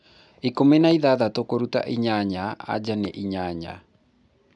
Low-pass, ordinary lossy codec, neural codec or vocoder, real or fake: 10.8 kHz; none; none; real